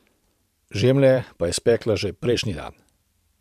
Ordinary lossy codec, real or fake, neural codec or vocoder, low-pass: MP3, 96 kbps; fake; vocoder, 44.1 kHz, 128 mel bands every 512 samples, BigVGAN v2; 14.4 kHz